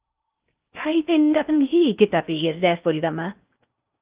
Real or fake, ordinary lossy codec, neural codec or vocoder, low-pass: fake; Opus, 24 kbps; codec, 16 kHz in and 24 kHz out, 0.6 kbps, FocalCodec, streaming, 2048 codes; 3.6 kHz